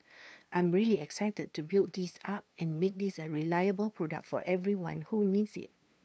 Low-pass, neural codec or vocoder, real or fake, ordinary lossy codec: none; codec, 16 kHz, 2 kbps, FunCodec, trained on LibriTTS, 25 frames a second; fake; none